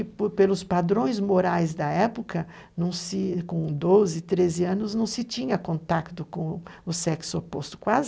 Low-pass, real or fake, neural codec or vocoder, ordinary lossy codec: none; real; none; none